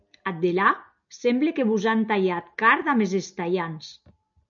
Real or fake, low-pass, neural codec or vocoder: real; 7.2 kHz; none